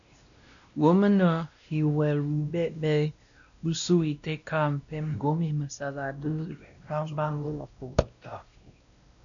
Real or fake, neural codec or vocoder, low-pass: fake; codec, 16 kHz, 1 kbps, X-Codec, WavLM features, trained on Multilingual LibriSpeech; 7.2 kHz